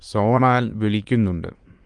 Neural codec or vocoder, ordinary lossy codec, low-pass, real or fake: autoencoder, 22.05 kHz, a latent of 192 numbers a frame, VITS, trained on many speakers; Opus, 24 kbps; 9.9 kHz; fake